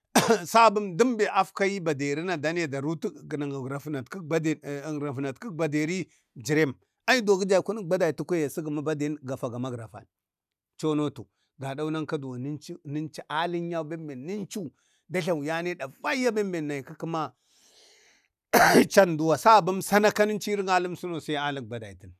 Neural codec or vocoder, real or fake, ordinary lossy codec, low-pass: none; real; none; 14.4 kHz